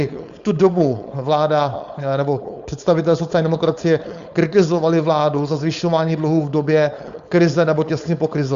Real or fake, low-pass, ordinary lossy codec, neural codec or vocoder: fake; 7.2 kHz; Opus, 64 kbps; codec, 16 kHz, 4.8 kbps, FACodec